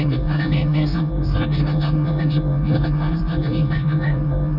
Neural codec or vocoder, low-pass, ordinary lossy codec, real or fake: codec, 24 kHz, 1 kbps, SNAC; 5.4 kHz; none; fake